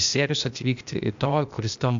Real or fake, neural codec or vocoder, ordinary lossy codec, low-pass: fake; codec, 16 kHz, 0.8 kbps, ZipCodec; MP3, 64 kbps; 7.2 kHz